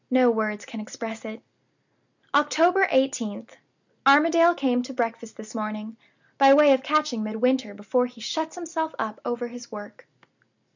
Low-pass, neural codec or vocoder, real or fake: 7.2 kHz; none; real